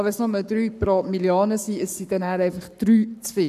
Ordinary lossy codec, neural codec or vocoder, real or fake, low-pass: none; codec, 44.1 kHz, 7.8 kbps, Pupu-Codec; fake; 14.4 kHz